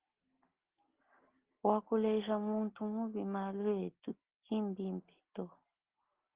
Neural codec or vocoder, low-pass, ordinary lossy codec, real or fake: none; 3.6 kHz; Opus, 16 kbps; real